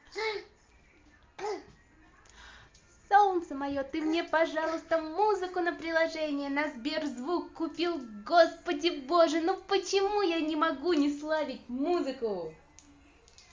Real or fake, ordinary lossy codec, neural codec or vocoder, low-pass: real; Opus, 32 kbps; none; 7.2 kHz